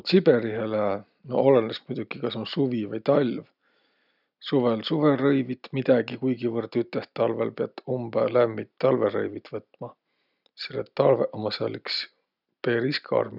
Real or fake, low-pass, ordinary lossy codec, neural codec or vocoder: real; 5.4 kHz; none; none